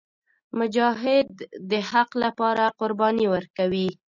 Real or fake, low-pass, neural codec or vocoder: real; 7.2 kHz; none